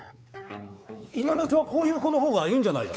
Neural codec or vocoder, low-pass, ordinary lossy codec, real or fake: codec, 16 kHz, 4 kbps, X-Codec, WavLM features, trained on Multilingual LibriSpeech; none; none; fake